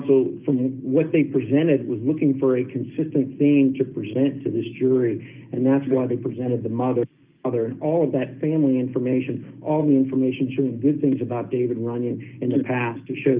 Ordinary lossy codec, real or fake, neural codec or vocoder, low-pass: Opus, 24 kbps; real; none; 3.6 kHz